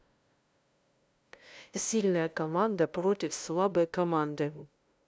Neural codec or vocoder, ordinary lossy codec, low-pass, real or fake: codec, 16 kHz, 0.5 kbps, FunCodec, trained on LibriTTS, 25 frames a second; none; none; fake